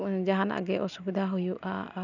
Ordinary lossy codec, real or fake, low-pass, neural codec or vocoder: none; real; 7.2 kHz; none